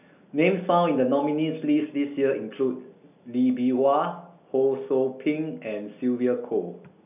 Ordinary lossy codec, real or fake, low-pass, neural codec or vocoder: none; real; 3.6 kHz; none